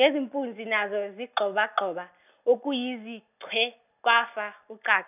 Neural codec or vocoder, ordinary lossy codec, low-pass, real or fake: none; none; 3.6 kHz; real